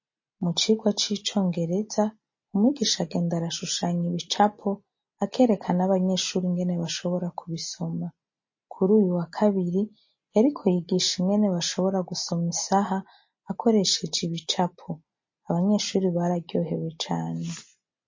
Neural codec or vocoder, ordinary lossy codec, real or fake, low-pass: none; MP3, 32 kbps; real; 7.2 kHz